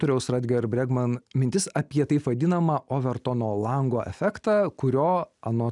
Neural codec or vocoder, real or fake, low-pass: none; real; 10.8 kHz